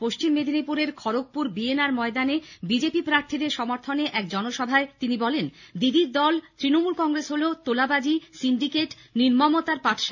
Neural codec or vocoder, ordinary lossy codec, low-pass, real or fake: none; none; 7.2 kHz; real